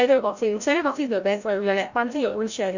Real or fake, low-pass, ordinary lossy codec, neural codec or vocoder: fake; 7.2 kHz; none; codec, 16 kHz, 0.5 kbps, FreqCodec, larger model